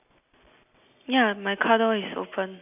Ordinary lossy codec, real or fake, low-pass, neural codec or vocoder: none; real; 3.6 kHz; none